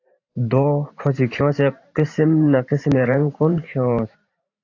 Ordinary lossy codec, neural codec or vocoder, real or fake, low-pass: AAC, 48 kbps; vocoder, 44.1 kHz, 128 mel bands every 512 samples, BigVGAN v2; fake; 7.2 kHz